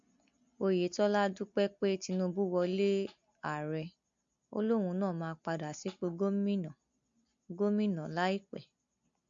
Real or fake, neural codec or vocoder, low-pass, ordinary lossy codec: real; none; 7.2 kHz; MP3, 48 kbps